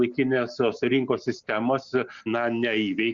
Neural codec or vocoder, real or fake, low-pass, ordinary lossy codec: none; real; 7.2 kHz; Opus, 64 kbps